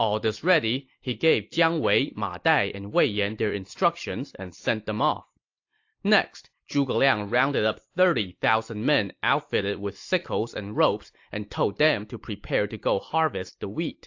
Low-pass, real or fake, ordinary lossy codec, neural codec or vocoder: 7.2 kHz; real; AAC, 48 kbps; none